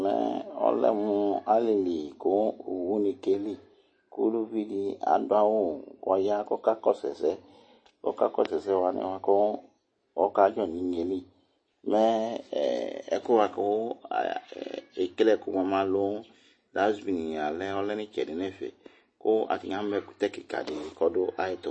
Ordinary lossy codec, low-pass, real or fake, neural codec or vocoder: MP3, 32 kbps; 9.9 kHz; real; none